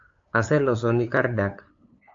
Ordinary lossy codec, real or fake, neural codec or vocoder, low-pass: AAC, 48 kbps; fake; codec, 16 kHz, 8 kbps, FunCodec, trained on LibriTTS, 25 frames a second; 7.2 kHz